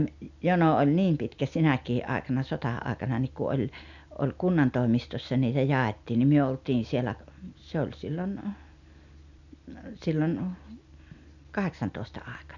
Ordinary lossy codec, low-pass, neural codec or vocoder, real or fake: none; 7.2 kHz; none; real